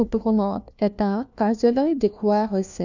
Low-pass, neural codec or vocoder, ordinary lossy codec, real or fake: 7.2 kHz; codec, 16 kHz, 1 kbps, FunCodec, trained on LibriTTS, 50 frames a second; none; fake